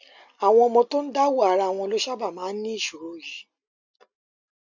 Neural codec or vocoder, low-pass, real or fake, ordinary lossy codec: none; 7.2 kHz; real; none